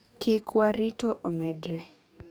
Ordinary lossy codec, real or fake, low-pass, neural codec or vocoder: none; fake; none; codec, 44.1 kHz, 2.6 kbps, DAC